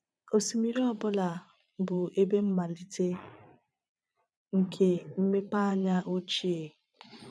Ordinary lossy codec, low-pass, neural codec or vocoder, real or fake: none; none; vocoder, 22.05 kHz, 80 mel bands, Vocos; fake